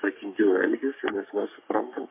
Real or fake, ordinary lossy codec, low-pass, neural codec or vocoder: fake; MP3, 24 kbps; 3.6 kHz; codec, 44.1 kHz, 3.4 kbps, Pupu-Codec